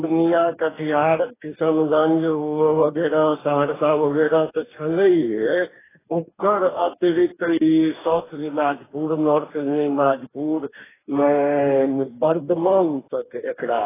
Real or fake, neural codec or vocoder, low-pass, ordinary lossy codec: fake; codec, 44.1 kHz, 2.6 kbps, DAC; 3.6 kHz; AAC, 16 kbps